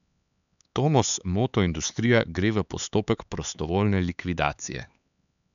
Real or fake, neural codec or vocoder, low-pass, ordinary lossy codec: fake; codec, 16 kHz, 4 kbps, X-Codec, HuBERT features, trained on LibriSpeech; 7.2 kHz; none